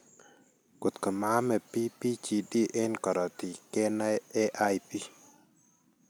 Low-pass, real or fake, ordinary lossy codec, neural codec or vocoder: none; real; none; none